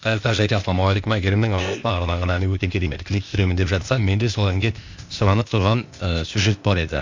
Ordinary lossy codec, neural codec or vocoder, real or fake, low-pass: MP3, 64 kbps; codec, 16 kHz, 0.8 kbps, ZipCodec; fake; 7.2 kHz